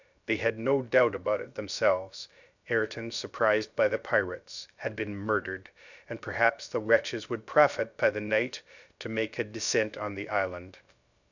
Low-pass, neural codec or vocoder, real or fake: 7.2 kHz; codec, 16 kHz, 0.3 kbps, FocalCodec; fake